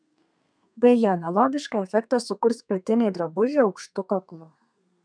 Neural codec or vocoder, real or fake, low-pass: codec, 32 kHz, 1.9 kbps, SNAC; fake; 9.9 kHz